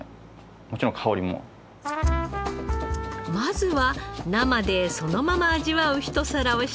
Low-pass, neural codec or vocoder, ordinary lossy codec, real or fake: none; none; none; real